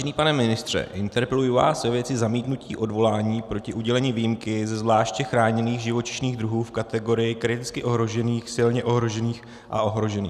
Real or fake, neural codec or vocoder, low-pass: real; none; 14.4 kHz